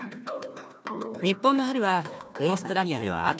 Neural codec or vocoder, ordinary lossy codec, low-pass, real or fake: codec, 16 kHz, 1 kbps, FunCodec, trained on Chinese and English, 50 frames a second; none; none; fake